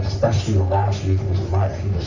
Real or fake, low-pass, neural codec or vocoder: fake; 7.2 kHz; codec, 44.1 kHz, 3.4 kbps, Pupu-Codec